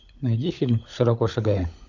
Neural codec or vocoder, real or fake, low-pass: codec, 16 kHz, 16 kbps, FunCodec, trained on LibriTTS, 50 frames a second; fake; 7.2 kHz